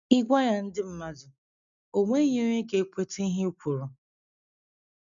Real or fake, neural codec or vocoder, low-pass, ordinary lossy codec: real; none; 7.2 kHz; none